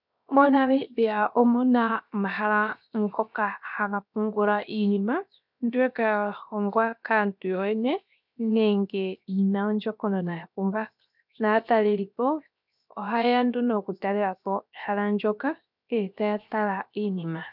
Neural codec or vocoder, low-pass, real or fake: codec, 16 kHz, 0.7 kbps, FocalCodec; 5.4 kHz; fake